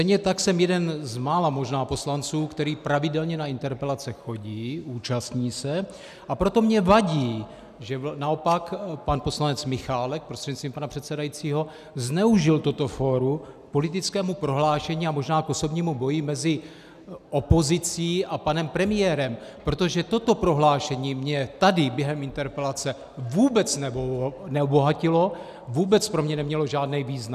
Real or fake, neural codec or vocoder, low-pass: real; none; 14.4 kHz